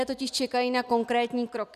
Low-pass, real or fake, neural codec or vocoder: 14.4 kHz; real; none